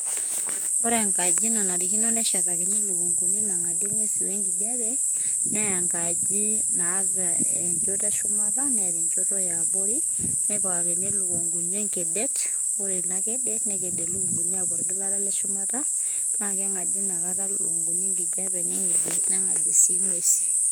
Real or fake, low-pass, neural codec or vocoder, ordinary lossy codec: fake; none; codec, 44.1 kHz, 7.8 kbps, DAC; none